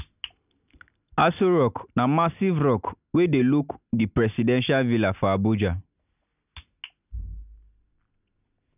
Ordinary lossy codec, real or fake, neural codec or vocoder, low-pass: none; real; none; 3.6 kHz